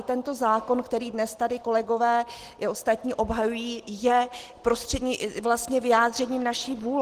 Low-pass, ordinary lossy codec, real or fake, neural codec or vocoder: 14.4 kHz; Opus, 16 kbps; real; none